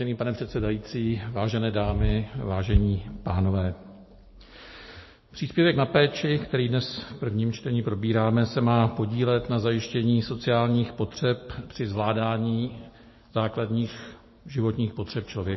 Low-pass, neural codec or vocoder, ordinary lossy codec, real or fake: 7.2 kHz; none; MP3, 24 kbps; real